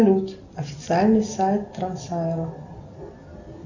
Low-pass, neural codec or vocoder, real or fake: 7.2 kHz; none; real